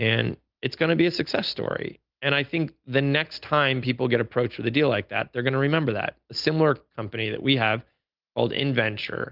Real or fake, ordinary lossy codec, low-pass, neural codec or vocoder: real; Opus, 32 kbps; 5.4 kHz; none